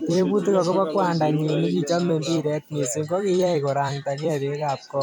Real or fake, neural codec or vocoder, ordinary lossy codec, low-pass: fake; vocoder, 44.1 kHz, 128 mel bands every 256 samples, BigVGAN v2; none; 19.8 kHz